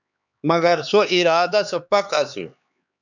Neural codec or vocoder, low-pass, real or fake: codec, 16 kHz, 4 kbps, X-Codec, HuBERT features, trained on LibriSpeech; 7.2 kHz; fake